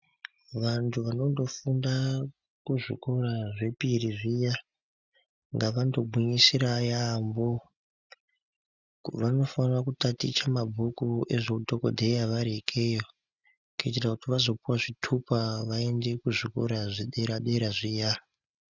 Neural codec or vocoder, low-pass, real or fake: none; 7.2 kHz; real